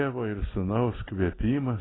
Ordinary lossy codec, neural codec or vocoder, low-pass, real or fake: AAC, 16 kbps; none; 7.2 kHz; real